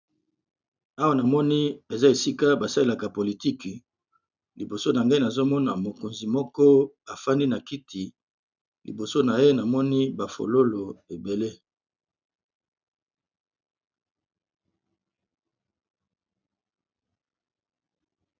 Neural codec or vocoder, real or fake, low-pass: none; real; 7.2 kHz